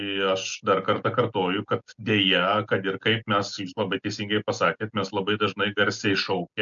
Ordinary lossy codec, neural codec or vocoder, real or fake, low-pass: MP3, 96 kbps; none; real; 7.2 kHz